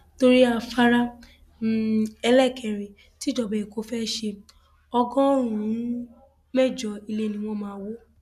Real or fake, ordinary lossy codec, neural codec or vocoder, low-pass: real; none; none; 14.4 kHz